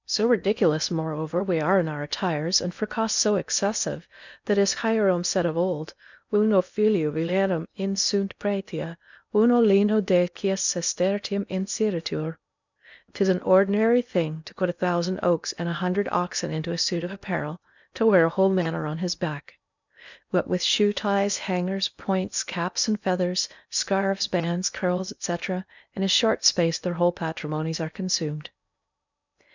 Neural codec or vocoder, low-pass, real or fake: codec, 16 kHz in and 24 kHz out, 0.8 kbps, FocalCodec, streaming, 65536 codes; 7.2 kHz; fake